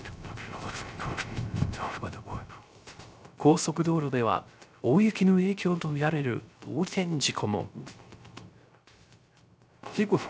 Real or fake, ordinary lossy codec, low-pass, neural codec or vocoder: fake; none; none; codec, 16 kHz, 0.3 kbps, FocalCodec